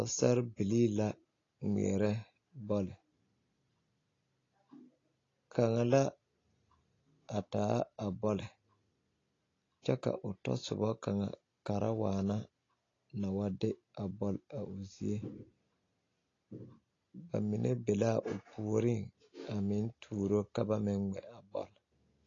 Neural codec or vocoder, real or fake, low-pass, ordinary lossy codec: none; real; 7.2 kHz; AAC, 32 kbps